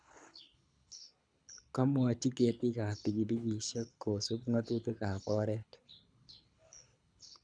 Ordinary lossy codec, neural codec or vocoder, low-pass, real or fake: none; codec, 24 kHz, 6 kbps, HILCodec; 9.9 kHz; fake